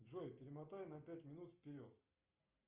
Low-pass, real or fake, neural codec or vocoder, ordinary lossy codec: 3.6 kHz; real; none; Opus, 24 kbps